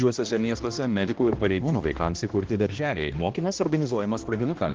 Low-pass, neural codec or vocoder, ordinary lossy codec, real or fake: 7.2 kHz; codec, 16 kHz, 1 kbps, X-Codec, HuBERT features, trained on balanced general audio; Opus, 16 kbps; fake